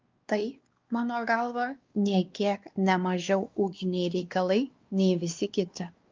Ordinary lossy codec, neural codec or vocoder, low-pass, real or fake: Opus, 32 kbps; codec, 16 kHz, 2 kbps, X-Codec, WavLM features, trained on Multilingual LibriSpeech; 7.2 kHz; fake